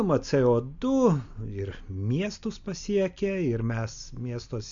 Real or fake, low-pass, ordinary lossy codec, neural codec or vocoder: real; 7.2 kHz; MP3, 64 kbps; none